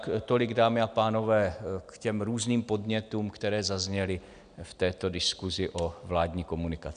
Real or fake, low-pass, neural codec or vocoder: real; 9.9 kHz; none